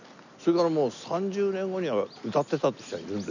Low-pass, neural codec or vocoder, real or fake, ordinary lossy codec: 7.2 kHz; none; real; none